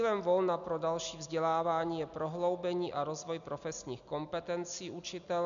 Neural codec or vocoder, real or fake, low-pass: none; real; 7.2 kHz